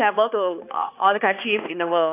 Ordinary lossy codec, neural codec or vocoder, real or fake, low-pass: AAC, 24 kbps; codec, 16 kHz, 2 kbps, X-Codec, HuBERT features, trained on balanced general audio; fake; 3.6 kHz